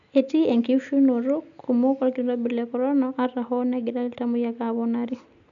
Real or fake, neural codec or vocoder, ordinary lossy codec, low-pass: real; none; none; 7.2 kHz